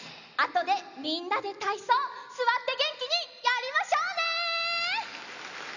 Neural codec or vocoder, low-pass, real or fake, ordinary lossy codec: none; 7.2 kHz; real; none